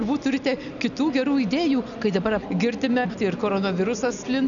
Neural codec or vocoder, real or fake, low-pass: none; real; 7.2 kHz